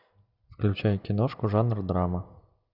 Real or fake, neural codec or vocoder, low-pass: real; none; 5.4 kHz